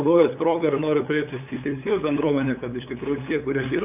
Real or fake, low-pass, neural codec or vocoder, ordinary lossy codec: fake; 3.6 kHz; codec, 16 kHz, 8 kbps, FunCodec, trained on LibriTTS, 25 frames a second; AAC, 32 kbps